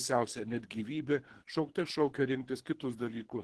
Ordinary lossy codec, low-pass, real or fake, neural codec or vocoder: Opus, 16 kbps; 10.8 kHz; fake; codec, 24 kHz, 3 kbps, HILCodec